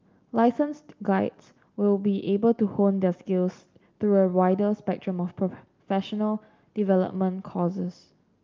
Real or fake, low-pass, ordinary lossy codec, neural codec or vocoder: real; 7.2 kHz; Opus, 24 kbps; none